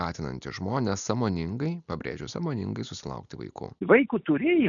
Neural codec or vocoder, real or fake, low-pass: none; real; 7.2 kHz